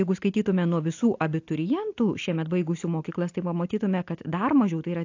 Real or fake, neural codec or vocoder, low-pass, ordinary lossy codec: real; none; 7.2 kHz; AAC, 48 kbps